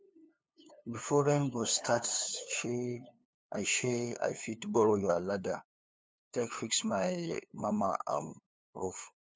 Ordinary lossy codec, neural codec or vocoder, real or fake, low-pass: none; codec, 16 kHz, 2 kbps, FunCodec, trained on LibriTTS, 25 frames a second; fake; none